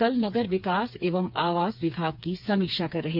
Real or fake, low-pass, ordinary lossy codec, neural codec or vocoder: fake; 5.4 kHz; none; codec, 16 kHz, 4 kbps, FreqCodec, smaller model